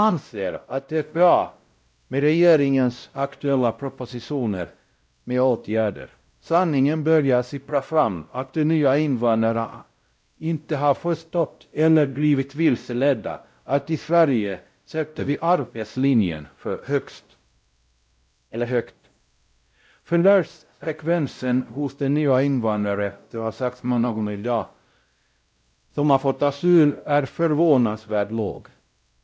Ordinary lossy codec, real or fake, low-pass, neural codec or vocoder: none; fake; none; codec, 16 kHz, 0.5 kbps, X-Codec, WavLM features, trained on Multilingual LibriSpeech